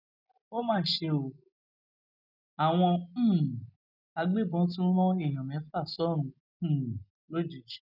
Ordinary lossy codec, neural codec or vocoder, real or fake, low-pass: none; none; real; 5.4 kHz